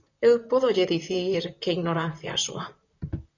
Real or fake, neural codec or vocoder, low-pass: fake; vocoder, 44.1 kHz, 128 mel bands, Pupu-Vocoder; 7.2 kHz